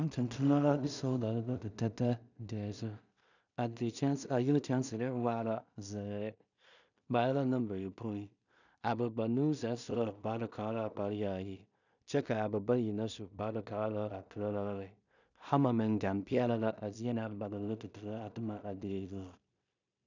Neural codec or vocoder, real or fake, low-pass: codec, 16 kHz in and 24 kHz out, 0.4 kbps, LongCat-Audio-Codec, two codebook decoder; fake; 7.2 kHz